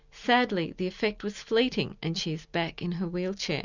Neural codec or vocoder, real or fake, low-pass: none; real; 7.2 kHz